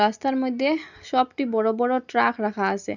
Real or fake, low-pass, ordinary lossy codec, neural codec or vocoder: real; 7.2 kHz; none; none